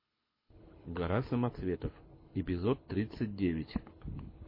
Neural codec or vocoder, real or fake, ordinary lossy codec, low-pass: codec, 24 kHz, 6 kbps, HILCodec; fake; MP3, 24 kbps; 5.4 kHz